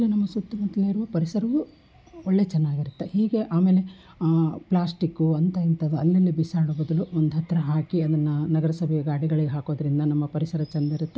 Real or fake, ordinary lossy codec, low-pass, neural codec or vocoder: real; none; none; none